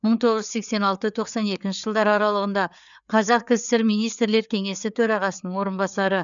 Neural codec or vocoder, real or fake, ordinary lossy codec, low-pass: codec, 16 kHz, 4 kbps, FreqCodec, larger model; fake; none; 7.2 kHz